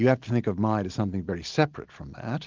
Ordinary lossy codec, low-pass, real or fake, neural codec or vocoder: Opus, 32 kbps; 7.2 kHz; real; none